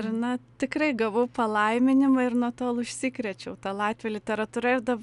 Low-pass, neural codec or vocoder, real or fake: 10.8 kHz; none; real